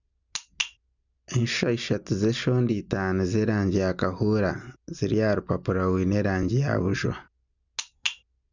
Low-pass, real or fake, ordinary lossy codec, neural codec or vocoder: 7.2 kHz; real; none; none